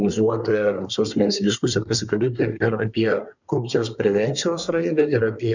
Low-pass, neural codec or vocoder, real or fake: 7.2 kHz; codec, 24 kHz, 1 kbps, SNAC; fake